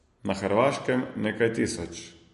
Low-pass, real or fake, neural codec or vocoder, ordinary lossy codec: 10.8 kHz; real; none; MP3, 48 kbps